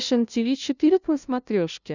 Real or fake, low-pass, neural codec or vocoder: fake; 7.2 kHz; codec, 16 kHz, 0.5 kbps, FunCodec, trained on LibriTTS, 25 frames a second